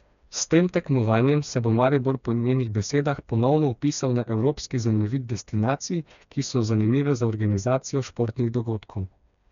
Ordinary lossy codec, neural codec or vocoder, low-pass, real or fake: none; codec, 16 kHz, 2 kbps, FreqCodec, smaller model; 7.2 kHz; fake